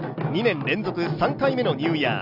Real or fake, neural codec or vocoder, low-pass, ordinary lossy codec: real; none; 5.4 kHz; none